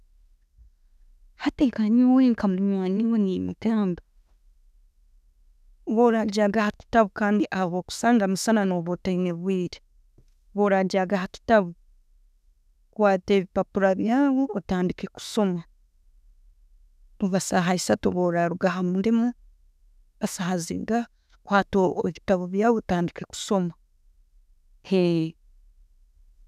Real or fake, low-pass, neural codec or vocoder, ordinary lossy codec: fake; 14.4 kHz; autoencoder, 48 kHz, 32 numbers a frame, DAC-VAE, trained on Japanese speech; none